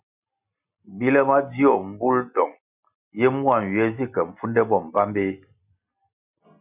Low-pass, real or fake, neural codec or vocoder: 3.6 kHz; real; none